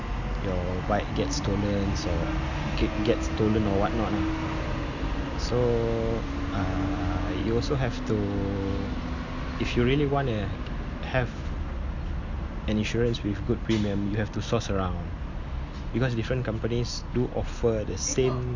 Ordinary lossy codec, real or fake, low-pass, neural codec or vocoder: none; real; 7.2 kHz; none